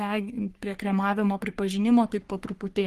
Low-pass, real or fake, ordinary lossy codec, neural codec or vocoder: 14.4 kHz; fake; Opus, 24 kbps; codec, 44.1 kHz, 3.4 kbps, Pupu-Codec